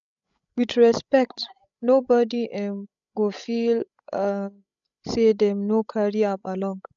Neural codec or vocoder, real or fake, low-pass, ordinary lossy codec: codec, 16 kHz, 16 kbps, FreqCodec, larger model; fake; 7.2 kHz; none